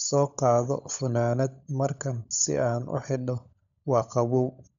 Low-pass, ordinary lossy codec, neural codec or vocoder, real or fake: 7.2 kHz; none; codec, 16 kHz, 16 kbps, FunCodec, trained on LibriTTS, 50 frames a second; fake